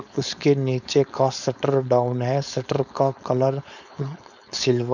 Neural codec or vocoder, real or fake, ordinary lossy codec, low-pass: codec, 16 kHz, 4.8 kbps, FACodec; fake; none; 7.2 kHz